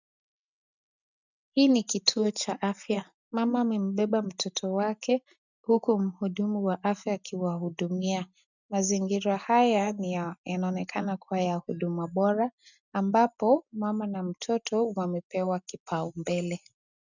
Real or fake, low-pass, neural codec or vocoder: real; 7.2 kHz; none